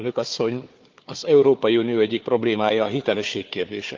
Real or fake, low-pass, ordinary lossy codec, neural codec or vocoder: fake; 7.2 kHz; Opus, 32 kbps; codec, 16 kHz, 4 kbps, FunCodec, trained on Chinese and English, 50 frames a second